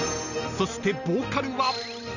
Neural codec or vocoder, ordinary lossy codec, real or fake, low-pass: none; none; real; 7.2 kHz